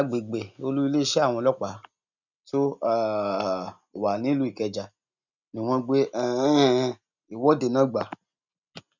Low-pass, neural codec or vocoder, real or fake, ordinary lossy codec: 7.2 kHz; none; real; none